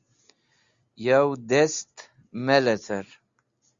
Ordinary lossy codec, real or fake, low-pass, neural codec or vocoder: Opus, 64 kbps; real; 7.2 kHz; none